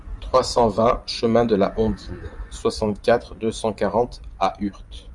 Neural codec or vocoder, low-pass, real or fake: vocoder, 24 kHz, 100 mel bands, Vocos; 10.8 kHz; fake